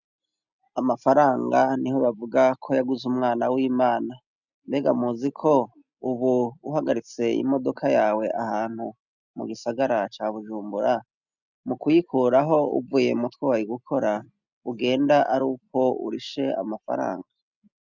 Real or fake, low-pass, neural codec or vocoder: real; 7.2 kHz; none